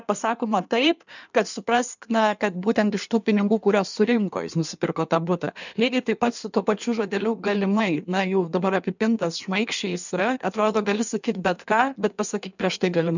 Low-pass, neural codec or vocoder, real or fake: 7.2 kHz; codec, 16 kHz in and 24 kHz out, 1.1 kbps, FireRedTTS-2 codec; fake